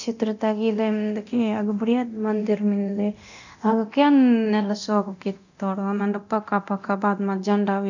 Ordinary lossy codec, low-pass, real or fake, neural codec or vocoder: none; 7.2 kHz; fake; codec, 24 kHz, 0.9 kbps, DualCodec